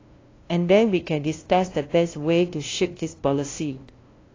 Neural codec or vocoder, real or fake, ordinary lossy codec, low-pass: codec, 16 kHz, 0.5 kbps, FunCodec, trained on LibriTTS, 25 frames a second; fake; AAC, 32 kbps; 7.2 kHz